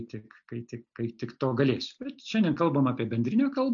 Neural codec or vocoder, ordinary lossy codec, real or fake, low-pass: none; AAC, 64 kbps; real; 7.2 kHz